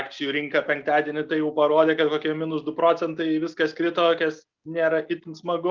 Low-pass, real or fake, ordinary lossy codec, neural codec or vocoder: 7.2 kHz; real; Opus, 24 kbps; none